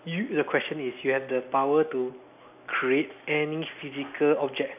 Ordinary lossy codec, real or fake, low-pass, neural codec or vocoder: none; real; 3.6 kHz; none